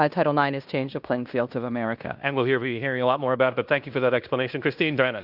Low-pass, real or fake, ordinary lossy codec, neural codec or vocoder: 5.4 kHz; fake; Opus, 64 kbps; codec, 16 kHz in and 24 kHz out, 0.9 kbps, LongCat-Audio-Codec, fine tuned four codebook decoder